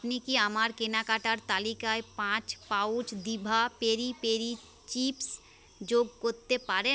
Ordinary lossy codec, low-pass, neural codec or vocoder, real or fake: none; none; none; real